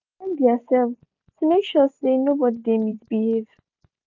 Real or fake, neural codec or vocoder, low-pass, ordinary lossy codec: real; none; 7.2 kHz; none